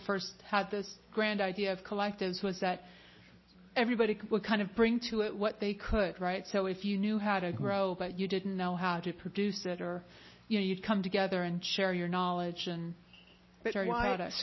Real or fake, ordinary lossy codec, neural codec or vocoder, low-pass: real; MP3, 24 kbps; none; 7.2 kHz